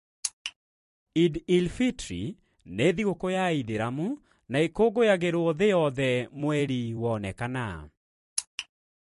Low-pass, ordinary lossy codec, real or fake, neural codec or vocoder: 14.4 kHz; MP3, 48 kbps; fake; vocoder, 44.1 kHz, 128 mel bands every 256 samples, BigVGAN v2